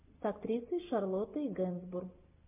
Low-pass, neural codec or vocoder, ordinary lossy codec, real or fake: 3.6 kHz; none; MP3, 32 kbps; real